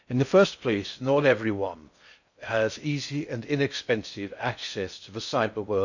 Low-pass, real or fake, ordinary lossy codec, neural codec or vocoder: 7.2 kHz; fake; none; codec, 16 kHz in and 24 kHz out, 0.6 kbps, FocalCodec, streaming, 4096 codes